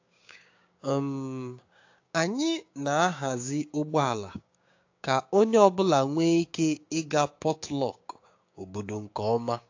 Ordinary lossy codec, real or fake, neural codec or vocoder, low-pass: AAC, 48 kbps; fake; codec, 16 kHz, 6 kbps, DAC; 7.2 kHz